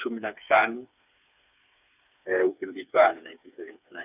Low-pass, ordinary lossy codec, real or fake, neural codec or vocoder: 3.6 kHz; none; fake; codec, 16 kHz, 4 kbps, FreqCodec, smaller model